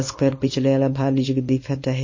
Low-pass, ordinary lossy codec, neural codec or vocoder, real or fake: 7.2 kHz; MP3, 32 kbps; codec, 24 kHz, 0.9 kbps, WavTokenizer, small release; fake